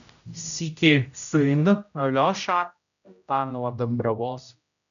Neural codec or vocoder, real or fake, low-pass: codec, 16 kHz, 0.5 kbps, X-Codec, HuBERT features, trained on general audio; fake; 7.2 kHz